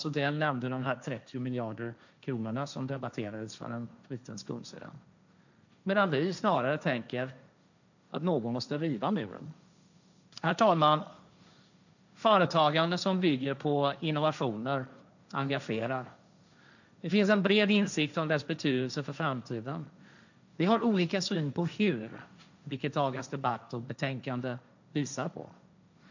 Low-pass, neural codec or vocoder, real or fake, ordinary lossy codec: 7.2 kHz; codec, 16 kHz, 1.1 kbps, Voila-Tokenizer; fake; none